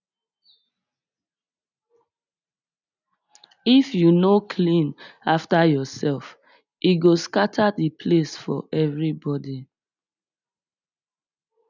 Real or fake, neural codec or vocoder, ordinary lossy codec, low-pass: real; none; none; 7.2 kHz